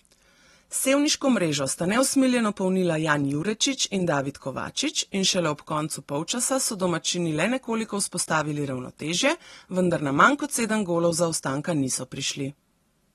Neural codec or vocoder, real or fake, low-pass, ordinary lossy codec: none; real; 19.8 kHz; AAC, 32 kbps